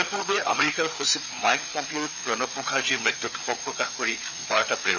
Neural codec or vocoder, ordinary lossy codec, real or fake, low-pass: codec, 16 kHz, 4 kbps, FreqCodec, larger model; none; fake; 7.2 kHz